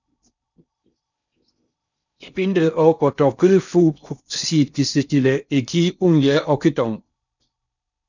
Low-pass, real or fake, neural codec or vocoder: 7.2 kHz; fake; codec, 16 kHz in and 24 kHz out, 0.6 kbps, FocalCodec, streaming, 4096 codes